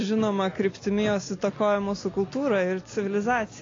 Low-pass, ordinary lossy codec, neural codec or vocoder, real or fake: 7.2 kHz; AAC, 32 kbps; none; real